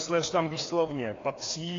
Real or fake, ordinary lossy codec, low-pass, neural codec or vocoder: fake; AAC, 32 kbps; 7.2 kHz; codec, 16 kHz, 2 kbps, FreqCodec, larger model